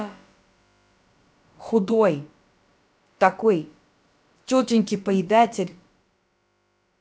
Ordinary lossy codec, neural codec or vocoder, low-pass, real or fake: none; codec, 16 kHz, about 1 kbps, DyCAST, with the encoder's durations; none; fake